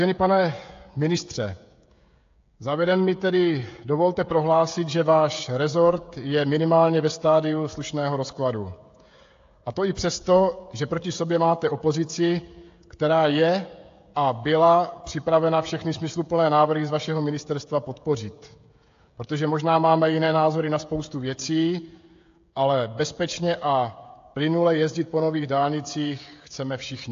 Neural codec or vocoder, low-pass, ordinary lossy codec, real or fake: codec, 16 kHz, 16 kbps, FreqCodec, smaller model; 7.2 kHz; AAC, 48 kbps; fake